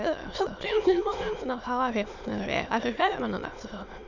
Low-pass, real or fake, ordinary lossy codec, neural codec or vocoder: 7.2 kHz; fake; none; autoencoder, 22.05 kHz, a latent of 192 numbers a frame, VITS, trained on many speakers